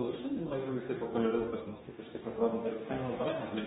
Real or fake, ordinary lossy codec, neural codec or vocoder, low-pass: fake; AAC, 16 kbps; codec, 44.1 kHz, 2.6 kbps, DAC; 19.8 kHz